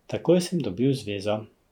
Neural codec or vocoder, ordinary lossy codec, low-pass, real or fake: vocoder, 44.1 kHz, 128 mel bands every 512 samples, BigVGAN v2; none; 19.8 kHz; fake